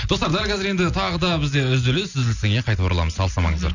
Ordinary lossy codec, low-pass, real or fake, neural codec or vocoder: none; 7.2 kHz; real; none